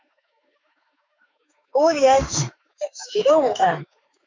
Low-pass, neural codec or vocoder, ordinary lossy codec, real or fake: 7.2 kHz; autoencoder, 48 kHz, 32 numbers a frame, DAC-VAE, trained on Japanese speech; MP3, 64 kbps; fake